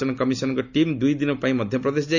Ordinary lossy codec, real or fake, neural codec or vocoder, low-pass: none; real; none; 7.2 kHz